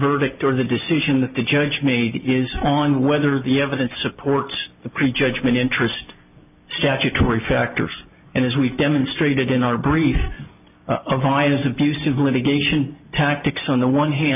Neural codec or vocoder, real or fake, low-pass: none; real; 3.6 kHz